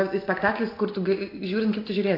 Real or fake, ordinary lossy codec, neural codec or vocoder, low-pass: real; AAC, 32 kbps; none; 5.4 kHz